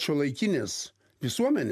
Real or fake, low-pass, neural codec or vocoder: real; 14.4 kHz; none